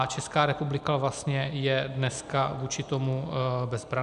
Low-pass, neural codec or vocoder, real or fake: 10.8 kHz; none; real